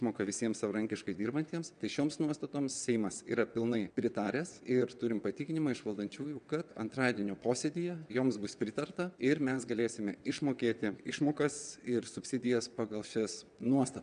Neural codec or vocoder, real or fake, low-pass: vocoder, 22.05 kHz, 80 mel bands, WaveNeXt; fake; 9.9 kHz